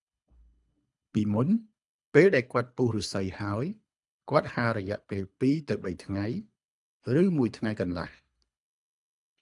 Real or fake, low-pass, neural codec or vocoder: fake; 10.8 kHz; codec, 24 kHz, 3 kbps, HILCodec